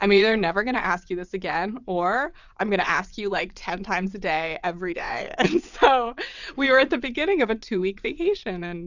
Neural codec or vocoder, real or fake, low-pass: vocoder, 22.05 kHz, 80 mel bands, WaveNeXt; fake; 7.2 kHz